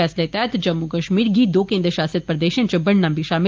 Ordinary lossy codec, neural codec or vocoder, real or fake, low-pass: Opus, 24 kbps; none; real; 7.2 kHz